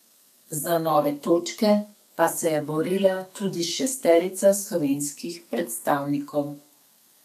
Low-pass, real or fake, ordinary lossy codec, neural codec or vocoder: 14.4 kHz; fake; none; codec, 32 kHz, 1.9 kbps, SNAC